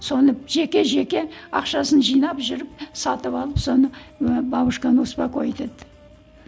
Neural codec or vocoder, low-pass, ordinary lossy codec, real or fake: none; none; none; real